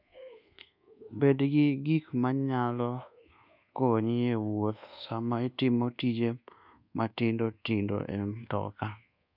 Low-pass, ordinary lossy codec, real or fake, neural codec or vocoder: 5.4 kHz; none; fake; codec, 24 kHz, 1.2 kbps, DualCodec